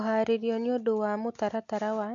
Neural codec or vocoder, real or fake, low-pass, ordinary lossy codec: none; real; 7.2 kHz; AAC, 48 kbps